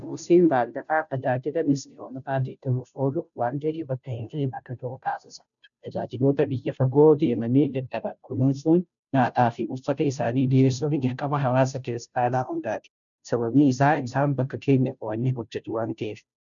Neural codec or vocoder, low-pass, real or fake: codec, 16 kHz, 0.5 kbps, FunCodec, trained on Chinese and English, 25 frames a second; 7.2 kHz; fake